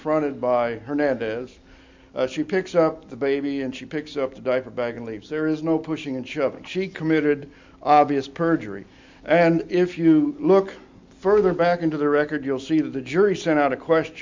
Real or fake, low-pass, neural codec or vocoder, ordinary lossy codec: real; 7.2 kHz; none; MP3, 48 kbps